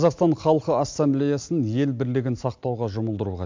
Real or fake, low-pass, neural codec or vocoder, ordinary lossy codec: real; 7.2 kHz; none; MP3, 64 kbps